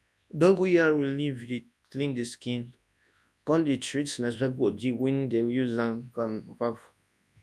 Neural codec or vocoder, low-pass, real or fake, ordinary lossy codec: codec, 24 kHz, 0.9 kbps, WavTokenizer, large speech release; none; fake; none